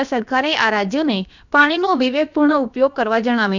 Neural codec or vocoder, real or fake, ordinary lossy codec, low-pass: codec, 16 kHz, about 1 kbps, DyCAST, with the encoder's durations; fake; none; 7.2 kHz